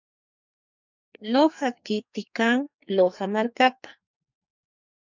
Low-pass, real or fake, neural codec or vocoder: 7.2 kHz; fake; codec, 44.1 kHz, 2.6 kbps, SNAC